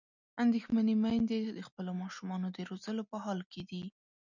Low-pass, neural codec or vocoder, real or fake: 7.2 kHz; none; real